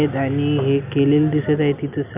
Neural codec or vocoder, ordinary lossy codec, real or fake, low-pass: none; none; real; 3.6 kHz